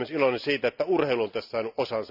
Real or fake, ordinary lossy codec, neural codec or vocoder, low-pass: real; none; none; 5.4 kHz